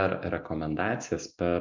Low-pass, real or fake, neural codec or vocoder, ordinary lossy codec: 7.2 kHz; real; none; MP3, 64 kbps